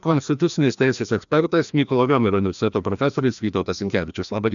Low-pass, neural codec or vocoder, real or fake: 7.2 kHz; codec, 16 kHz, 1 kbps, FreqCodec, larger model; fake